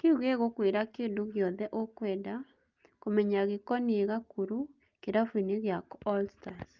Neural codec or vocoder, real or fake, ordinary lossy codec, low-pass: none; real; Opus, 24 kbps; 7.2 kHz